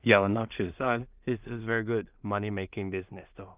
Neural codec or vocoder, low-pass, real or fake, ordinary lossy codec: codec, 16 kHz in and 24 kHz out, 0.4 kbps, LongCat-Audio-Codec, two codebook decoder; 3.6 kHz; fake; Opus, 24 kbps